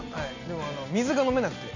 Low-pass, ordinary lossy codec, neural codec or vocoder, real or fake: 7.2 kHz; MP3, 64 kbps; none; real